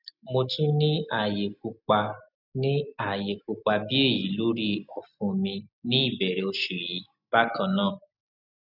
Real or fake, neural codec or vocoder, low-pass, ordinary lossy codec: real; none; 5.4 kHz; none